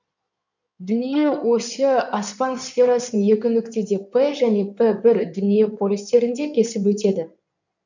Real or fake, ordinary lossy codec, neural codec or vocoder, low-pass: fake; none; codec, 16 kHz in and 24 kHz out, 2.2 kbps, FireRedTTS-2 codec; 7.2 kHz